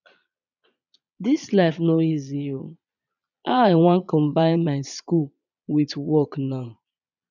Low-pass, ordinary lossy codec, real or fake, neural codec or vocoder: 7.2 kHz; none; fake; vocoder, 22.05 kHz, 80 mel bands, Vocos